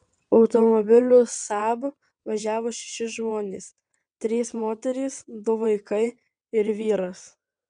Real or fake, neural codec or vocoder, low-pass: fake; vocoder, 22.05 kHz, 80 mel bands, WaveNeXt; 9.9 kHz